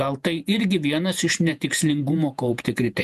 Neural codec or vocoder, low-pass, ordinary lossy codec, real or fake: vocoder, 48 kHz, 128 mel bands, Vocos; 14.4 kHz; MP3, 96 kbps; fake